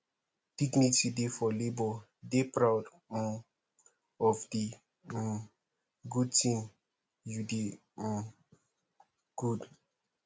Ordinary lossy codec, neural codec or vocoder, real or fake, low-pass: none; none; real; none